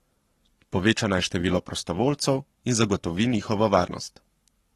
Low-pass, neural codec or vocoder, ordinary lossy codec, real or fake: 19.8 kHz; codec, 44.1 kHz, 7.8 kbps, Pupu-Codec; AAC, 32 kbps; fake